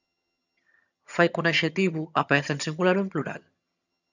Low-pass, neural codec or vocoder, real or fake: 7.2 kHz; vocoder, 22.05 kHz, 80 mel bands, HiFi-GAN; fake